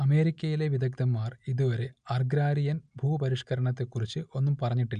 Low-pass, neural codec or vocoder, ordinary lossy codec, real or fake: 9.9 kHz; none; none; real